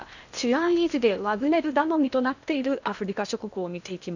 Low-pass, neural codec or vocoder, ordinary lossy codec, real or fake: 7.2 kHz; codec, 16 kHz in and 24 kHz out, 0.8 kbps, FocalCodec, streaming, 65536 codes; none; fake